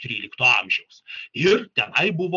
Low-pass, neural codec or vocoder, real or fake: 7.2 kHz; none; real